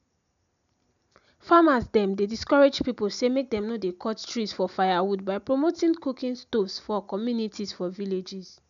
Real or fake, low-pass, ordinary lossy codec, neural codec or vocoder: real; 7.2 kHz; none; none